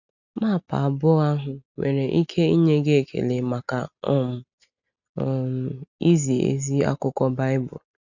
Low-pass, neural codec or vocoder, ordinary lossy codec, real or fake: 7.2 kHz; none; none; real